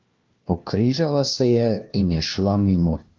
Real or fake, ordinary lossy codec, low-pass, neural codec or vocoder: fake; Opus, 24 kbps; 7.2 kHz; codec, 16 kHz, 1 kbps, FunCodec, trained on LibriTTS, 50 frames a second